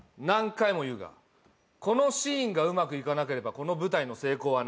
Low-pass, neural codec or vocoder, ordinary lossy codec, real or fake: none; none; none; real